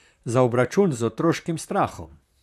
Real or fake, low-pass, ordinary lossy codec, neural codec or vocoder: fake; 14.4 kHz; none; vocoder, 44.1 kHz, 128 mel bands, Pupu-Vocoder